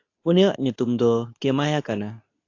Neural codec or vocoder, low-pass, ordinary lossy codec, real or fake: codec, 24 kHz, 0.9 kbps, WavTokenizer, medium speech release version 2; 7.2 kHz; AAC, 48 kbps; fake